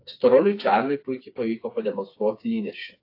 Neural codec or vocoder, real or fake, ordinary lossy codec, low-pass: codec, 16 kHz, 4 kbps, FreqCodec, smaller model; fake; AAC, 32 kbps; 5.4 kHz